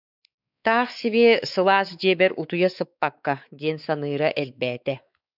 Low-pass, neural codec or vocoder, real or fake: 5.4 kHz; codec, 16 kHz, 4 kbps, X-Codec, WavLM features, trained on Multilingual LibriSpeech; fake